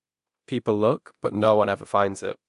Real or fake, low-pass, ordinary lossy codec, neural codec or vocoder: fake; 10.8 kHz; AAC, 64 kbps; codec, 24 kHz, 0.9 kbps, DualCodec